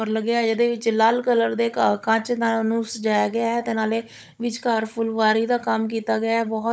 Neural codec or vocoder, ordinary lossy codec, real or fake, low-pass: codec, 16 kHz, 16 kbps, FunCodec, trained on Chinese and English, 50 frames a second; none; fake; none